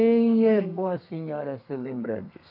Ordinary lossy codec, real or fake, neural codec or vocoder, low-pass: none; fake; codec, 32 kHz, 1.9 kbps, SNAC; 5.4 kHz